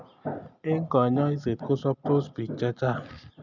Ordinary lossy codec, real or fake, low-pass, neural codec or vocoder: none; real; 7.2 kHz; none